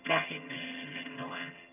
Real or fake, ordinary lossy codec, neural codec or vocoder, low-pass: fake; none; vocoder, 22.05 kHz, 80 mel bands, HiFi-GAN; 3.6 kHz